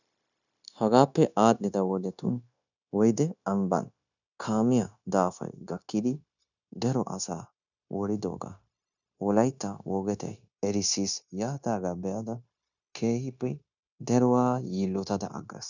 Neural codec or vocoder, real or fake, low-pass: codec, 16 kHz, 0.9 kbps, LongCat-Audio-Codec; fake; 7.2 kHz